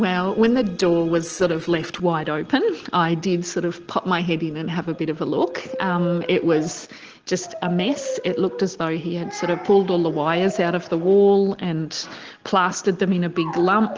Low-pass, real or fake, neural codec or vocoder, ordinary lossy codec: 7.2 kHz; real; none; Opus, 16 kbps